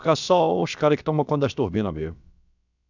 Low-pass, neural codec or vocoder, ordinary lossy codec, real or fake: 7.2 kHz; codec, 16 kHz, about 1 kbps, DyCAST, with the encoder's durations; none; fake